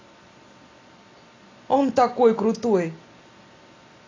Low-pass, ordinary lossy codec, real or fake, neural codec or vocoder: 7.2 kHz; AAC, 32 kbps; real; none